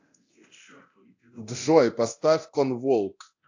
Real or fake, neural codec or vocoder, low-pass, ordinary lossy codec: fake; codec, 24 kHz, 0.9 kbps, DualCodec; 7.2 kHz; AAC, 48 kbps